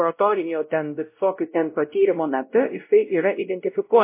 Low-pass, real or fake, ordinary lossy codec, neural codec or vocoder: 3.6 kHz; fake; MP3, 24 kbps; codec, 16 kHz, 0.5 kbps, X-Codec, WavLM features, trained on Multilingual LibriSpeech